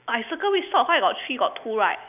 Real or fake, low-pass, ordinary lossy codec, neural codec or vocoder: real; 3.6 kHz; none; none